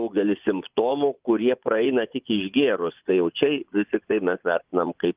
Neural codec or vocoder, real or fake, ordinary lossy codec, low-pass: vocoder, 24 kHz, 100 mel bands, Vocos; fake; Opus, 24 kbps; 3.6 kHz